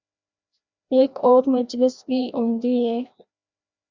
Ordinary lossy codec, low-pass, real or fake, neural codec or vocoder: Opus, 64 kbps; 7.2 kHz; fake; codec, 16 kHz, 1 kbps, FreqCodec, larger model